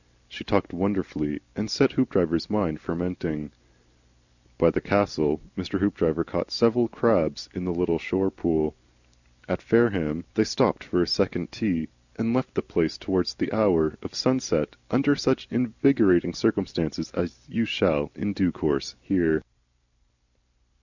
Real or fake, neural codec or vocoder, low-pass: real; none; 7.2 kHz